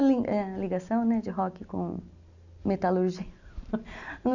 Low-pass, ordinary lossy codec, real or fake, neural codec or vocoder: 7.2 kHz; none; real; none